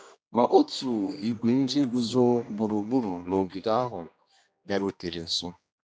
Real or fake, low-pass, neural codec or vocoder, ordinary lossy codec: fake; none; codec, 16 kHz, 1 kbps, X-Codec, HuBERT features, trained on general audio; none